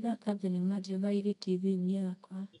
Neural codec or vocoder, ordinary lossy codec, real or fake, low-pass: codec, 24 kHz, 0.9 kbps, WavTokenizer, medium music audio release; none; fake; 10.8 kHz